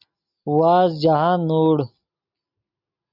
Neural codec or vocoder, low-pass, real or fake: none; 5.4 kHz; real